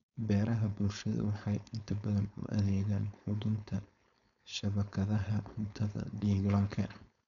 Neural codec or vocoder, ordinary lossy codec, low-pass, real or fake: codec, 16 kHz, 4.8 kbps, FACodec; none; 7.2 kHz; fake